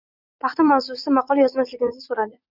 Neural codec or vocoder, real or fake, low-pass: vocoder, 44.1 kHz, 80 mel bands, Vocos; fake; 5.4 kHz